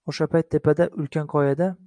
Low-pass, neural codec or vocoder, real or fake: 9.9 kHz; none; real